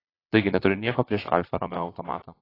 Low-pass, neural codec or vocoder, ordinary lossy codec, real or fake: 5.4 kHz; vocoder, 22.05 kHz, 80 mel bands, Vocos; AAC, 24 kbps; fake